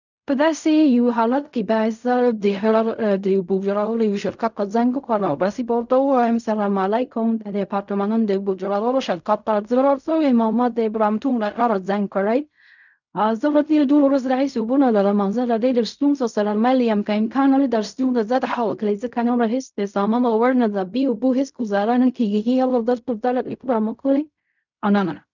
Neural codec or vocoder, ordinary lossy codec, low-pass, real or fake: codec, 16 kHz in and 24 kHz out, 0.4 kbps, LongCat-Audio-Codec, fine tuned four codebook decoder; none; 7.2 kHz; fake